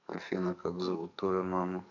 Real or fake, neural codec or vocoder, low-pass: fake; codec, 44.1 kHz, 2.6 kbps, SNAC; 7.2 kHz